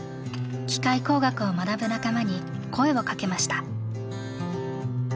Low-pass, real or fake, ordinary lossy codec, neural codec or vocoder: none; real; none; none